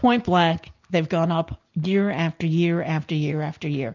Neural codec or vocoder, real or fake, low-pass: none; real; 7.2 kHz